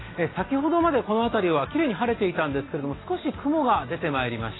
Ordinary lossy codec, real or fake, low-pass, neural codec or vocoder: AAC, 16 kbps; real; 7.2 kHz; none